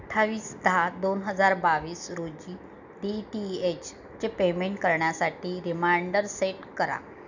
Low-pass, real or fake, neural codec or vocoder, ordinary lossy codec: 7.2 kHz; real; none; none